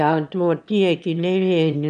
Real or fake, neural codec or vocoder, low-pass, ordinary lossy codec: fake; autoencoder, 22.05 kHz, a latent of 192 numbers a frame, VITS, trained on one speaker; 9.9 kHz; none